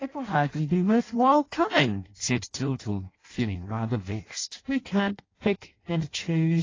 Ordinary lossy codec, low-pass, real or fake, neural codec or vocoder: AAC, 32 kbps; 7.2 kHz; fake; codec, 16 kHz in and 24 kHz out, 0.6 kbps, FireRedTTS-2 codec